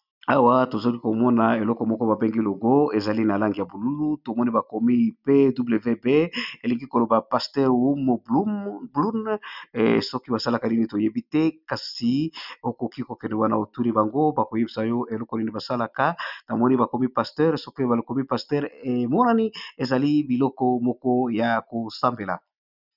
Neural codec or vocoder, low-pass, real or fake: none; 5.4 kHz; real